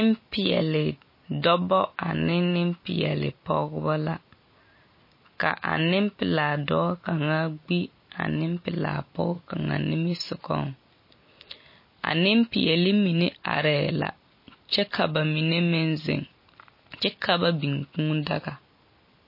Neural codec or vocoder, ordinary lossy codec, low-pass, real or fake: none; MP3, 24 kbps; 5.4 kHz; real